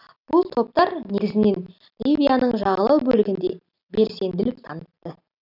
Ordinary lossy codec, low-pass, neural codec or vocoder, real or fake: none; 5.4 kHz; none; real